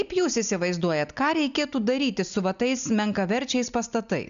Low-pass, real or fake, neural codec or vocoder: 7.2 kHz; real; none